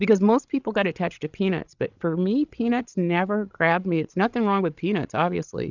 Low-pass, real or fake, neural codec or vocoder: 7.2 kHz; fake; codec, 16 kHz, 16 kbps, FreqCodec, larger model